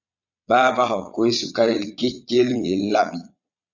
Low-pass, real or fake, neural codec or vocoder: 7.2 kHz; fake; vocoder, 22.05 kHz, 80 mel bands, Vocos